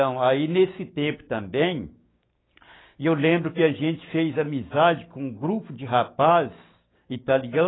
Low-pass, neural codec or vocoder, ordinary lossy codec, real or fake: 7.2 kHz; codec, 16 kHz, 6 kbps, DAC; AAC, 16 kbps; fake